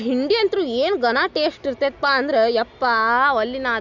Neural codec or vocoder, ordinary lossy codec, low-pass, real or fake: none; none; 7.2 kHz; real